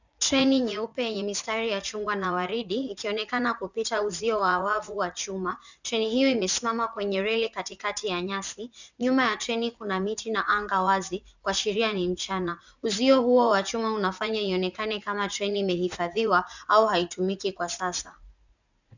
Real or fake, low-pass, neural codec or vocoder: fake; 7.2 kHz; vocoder, 44.1 kHz, 80 mel bands, Vocos